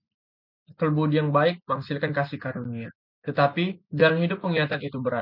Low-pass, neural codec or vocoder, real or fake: 5.4 kHz; none; real